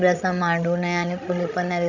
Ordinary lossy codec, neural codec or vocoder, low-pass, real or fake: none; codec, 16 kHz, 16 kbps, FreqCodec, larger model; 7.2 kHz; fake